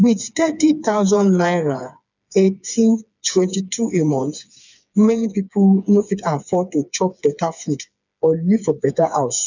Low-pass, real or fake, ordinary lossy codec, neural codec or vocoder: 7.2 kHz; fake; none; codec, 16 kHz, 4 kbps, FreqCodec, smaller model